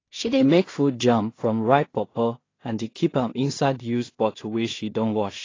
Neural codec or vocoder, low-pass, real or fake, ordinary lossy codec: codec, 16 kHz in and 24 kHz out, 0.4 kbps, LongCat-Audio-Codec, two codebook decoder; 7.2 kHz; fake; AAC, 32 kbps